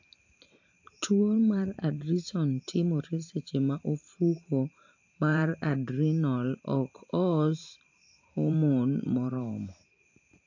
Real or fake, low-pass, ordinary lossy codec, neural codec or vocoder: fake; 7.2 kHz; AAC, 48 kbps; vocoder, 44.1 kHz, 128 mel bands every 512 samples, BigVGAN v2